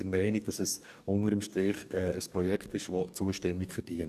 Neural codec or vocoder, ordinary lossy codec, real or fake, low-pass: codec, 44.1 kHz, 2.6 kbps, DAC; none; fake; 14.4 kHz